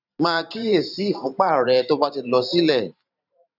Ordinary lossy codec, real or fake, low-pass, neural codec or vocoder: none; fake; 5.4 kHz; vocoder, 44.1 kHz, 128 mel bands every 512 samples, BigVGAN v2